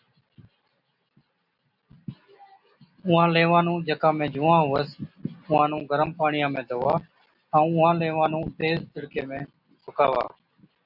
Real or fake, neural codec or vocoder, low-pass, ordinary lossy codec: real; none; 5.4 kHz; AAC, 48 kbps